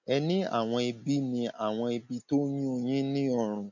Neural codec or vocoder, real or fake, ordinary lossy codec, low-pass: none; real; none; 7.2 kHz